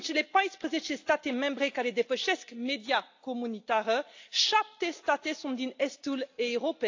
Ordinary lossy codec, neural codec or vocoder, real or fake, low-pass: AAC, 48 kbps; none; real; 7.2 kHz